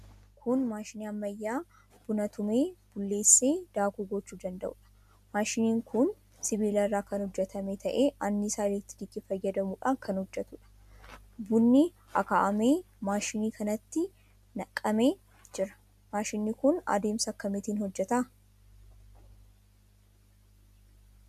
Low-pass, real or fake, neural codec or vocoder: 14.4 kHz; real; none